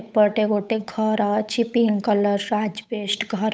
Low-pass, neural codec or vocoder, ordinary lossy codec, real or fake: none; codec, 16 kHz, 8 kbps, FunCodec, trained on Chinese and English, 25 frames a second; none; fake